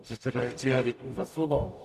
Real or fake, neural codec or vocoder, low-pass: fake; codec, 44.1 kHz, 0.9 kbps, DAC; 14.4 kHz